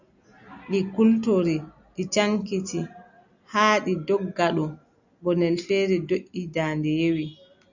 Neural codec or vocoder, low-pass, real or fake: none; 7.2 kHz; real